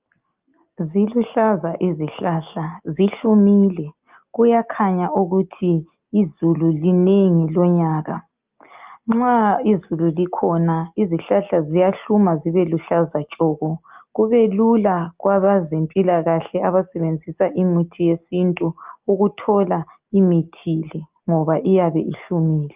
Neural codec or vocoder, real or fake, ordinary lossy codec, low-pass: none; real; Opus, 24 kbps; 3.6 kHz